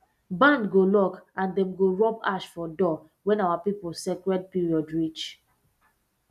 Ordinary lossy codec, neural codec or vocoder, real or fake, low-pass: none; none; real; 14.4 kHz